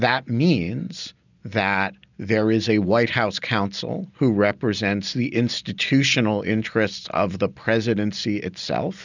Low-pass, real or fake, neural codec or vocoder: 7.2 kHz; real; none